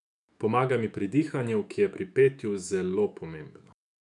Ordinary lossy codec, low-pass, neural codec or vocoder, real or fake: none; 10.8 kHz; autoencoder, 48 kHz, 128 numbers a frame, DAC-VAE, trained on Japanese speech; fake